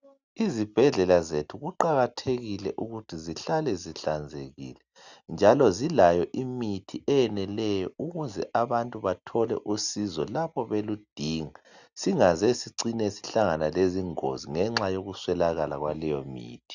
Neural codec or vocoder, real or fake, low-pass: none; real; 7.2 kHz